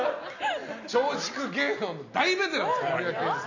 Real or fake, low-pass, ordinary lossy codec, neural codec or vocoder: real; 7.2 kHz; none; none